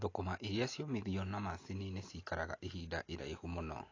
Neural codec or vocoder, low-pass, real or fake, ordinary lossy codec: none; 7.2 kHz; real; AAC, 32 kbps